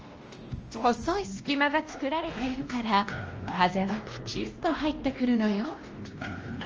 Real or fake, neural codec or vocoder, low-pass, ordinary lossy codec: fake; codec, 16 kHz, 1 kbps, X-Codec, WavLM features, trained on Multilingual LibriSpeech; 7.2 kHz; Opus, 24 kbps